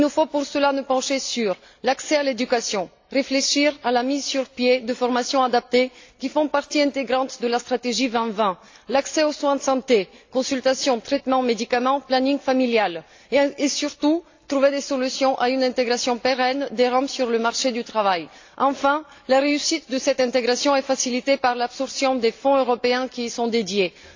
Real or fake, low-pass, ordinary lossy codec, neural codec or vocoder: real; 7.2 kHz; AAC, 48 kbps; none